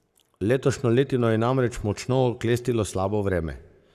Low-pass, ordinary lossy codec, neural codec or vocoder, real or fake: 14.4 kHz; none; codec, 44.1 kHz, 7.8 kbps, Pupu-Codec; fake